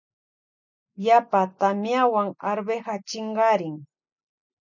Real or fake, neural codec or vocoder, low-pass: real; none; 7.2 kHz